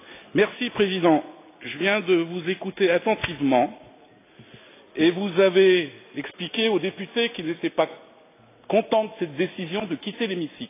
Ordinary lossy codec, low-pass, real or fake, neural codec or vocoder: AAC, 24 kbps; 3.6 kHz; real; none